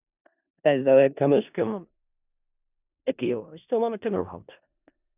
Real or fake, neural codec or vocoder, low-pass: fake; codec, 16 kHz in and 24 kHz out, 0.4 kbps, LongCat-Audio-Codec, four codebook decoder; 3.6 kHz